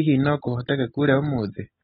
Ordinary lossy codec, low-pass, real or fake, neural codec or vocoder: AAC, 16 kbps; 19.8 kHz; real; none